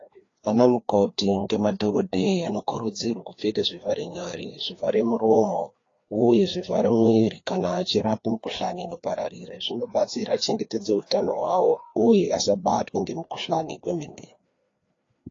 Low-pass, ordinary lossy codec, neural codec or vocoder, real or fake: 7.2 kHz; AAC, 32 kbps; codec, 16 kHz, 2 kbps, FreqCodec, larger model; fake